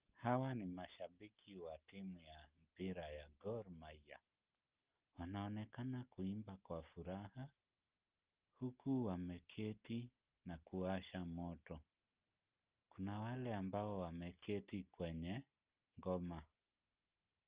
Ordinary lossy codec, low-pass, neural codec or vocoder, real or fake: Opus, 32 kbps; 3.6 kHz; none; real